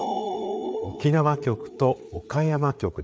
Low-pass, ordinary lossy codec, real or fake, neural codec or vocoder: none; none; fake; codec, 16 kHz, 4 kbps, FreqCodec, larger model